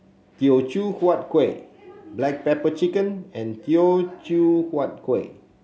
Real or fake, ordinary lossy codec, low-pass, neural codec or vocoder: real; none; none; none